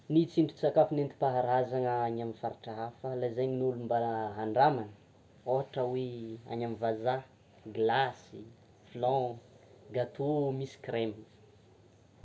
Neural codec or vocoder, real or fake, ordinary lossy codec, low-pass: none; real; none; none